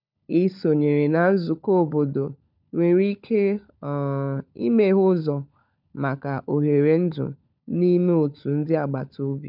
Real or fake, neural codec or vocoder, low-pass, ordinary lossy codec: fake; codec, 16 kHz, 16 kbps, FunCodec, trained on LibriTTS, 50 frames a second; 5.4 kHz; none